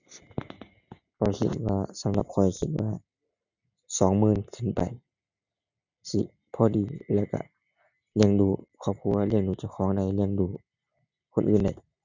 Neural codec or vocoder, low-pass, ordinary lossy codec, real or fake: none; 7.2 kHz; none; real